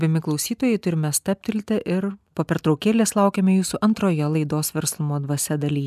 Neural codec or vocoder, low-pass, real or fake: none; 14.4 kHz; real